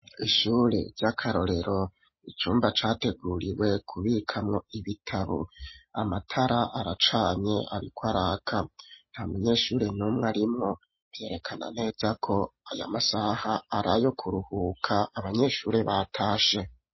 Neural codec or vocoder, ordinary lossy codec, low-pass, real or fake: none; MP3, 24 kbps; 7.2 kHz; real